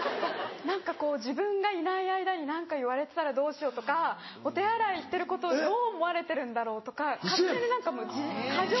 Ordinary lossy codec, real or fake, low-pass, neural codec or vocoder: MP3, 24 kbps; real; 7.2 kHz; none